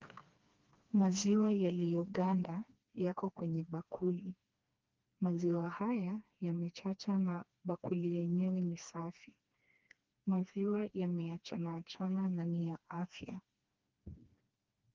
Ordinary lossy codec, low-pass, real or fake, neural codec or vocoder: Opus, 24 kbps; 7.2 kHz; fake; codec, 16 kHz, 2 kbps, FreqCodec, smaller model